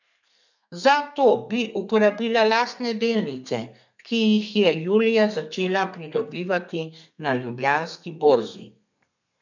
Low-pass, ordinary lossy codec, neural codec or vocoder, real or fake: 7.2 kHz; none; codec, 32 kHz, 1.9 kbps, SNAC; fake